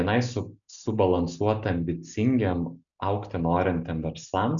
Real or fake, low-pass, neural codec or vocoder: real; 7.2 kHz; none